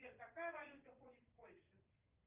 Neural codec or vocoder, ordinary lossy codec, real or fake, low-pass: vocoder, 22.05 kHz, 80 mel bands, Vocos; Opus, 24 kbps; fake; 3.6 kHz